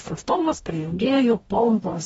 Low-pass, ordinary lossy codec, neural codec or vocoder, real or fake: 19.8 kHz; AAC, 24 kbps; codec, 44.1 kHz, 0.9 kbps, DAC; fake